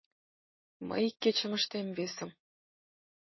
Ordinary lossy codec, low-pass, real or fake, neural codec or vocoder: MP3, 24 kbps; 7.2 kHz; real; none